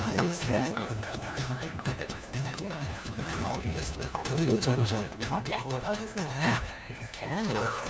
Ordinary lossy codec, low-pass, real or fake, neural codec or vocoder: none; none; fake; codec, 16 kHz, 1 kbps, FunCodec, trained on LibriTTS, 50 frames a second